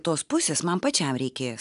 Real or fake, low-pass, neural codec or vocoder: real; 10.8 kHz; none